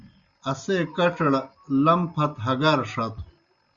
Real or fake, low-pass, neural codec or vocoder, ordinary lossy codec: real; 7.2 kHz; none; Opus, 64 kbps